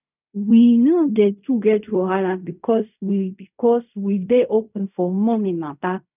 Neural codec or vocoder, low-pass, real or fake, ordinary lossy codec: codec, 16 kHz in and 24 kHz out, 0.4 kbps, LongCat-Audio-Codec, fine tuned four codebook decoder; 3.6 kHz; fake; none